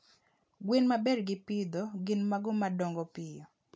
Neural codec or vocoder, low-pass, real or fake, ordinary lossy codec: none; none; real; none